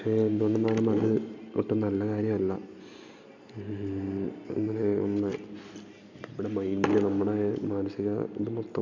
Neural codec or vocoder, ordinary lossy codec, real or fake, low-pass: none; none; real; 7.2 kHz